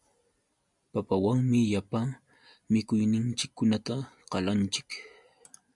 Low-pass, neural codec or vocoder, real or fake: 10.8 kHz; none; real